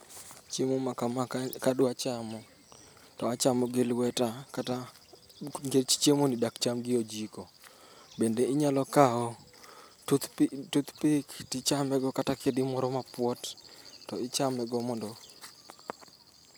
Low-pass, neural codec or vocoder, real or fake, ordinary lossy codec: none; none; real; none